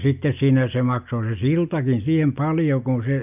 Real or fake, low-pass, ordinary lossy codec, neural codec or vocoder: real; 3.6 kHz; none; none